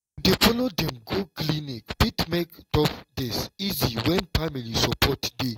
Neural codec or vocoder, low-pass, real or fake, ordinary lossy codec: none; 19.8 kHz; real; AAC, 48 kbps